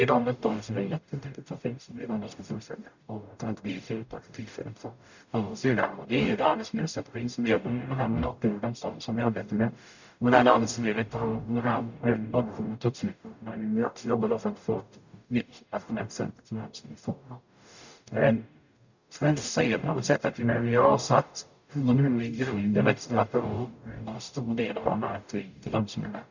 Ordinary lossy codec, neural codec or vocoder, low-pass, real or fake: none; codec, 44.1 kHz, 0.9 kbps, DAC; 7.2 kHz; fake